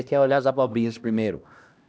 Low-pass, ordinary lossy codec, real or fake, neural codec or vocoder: none; none; fake; codec, 16 kHz, 1 kbps, X-Codec, HuBERT features, trained on LibriSpeech